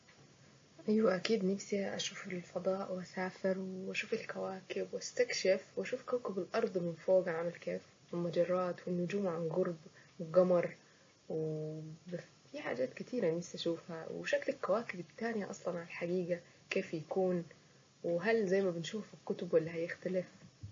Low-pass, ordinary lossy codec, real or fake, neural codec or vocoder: 7.2 kHz; MP3, 32 kbps; real; none